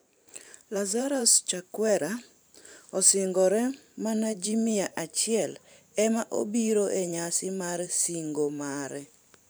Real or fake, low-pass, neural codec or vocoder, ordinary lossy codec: fake; none; vocoder, 44.1 kHz, 128 mel bands every 512 samples, BigVGAN v2; none